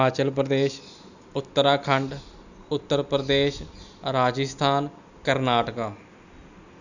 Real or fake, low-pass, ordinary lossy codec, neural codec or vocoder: real; 7.2 kHz; none; none